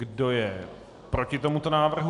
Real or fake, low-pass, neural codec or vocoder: real; 10.8 kHz; none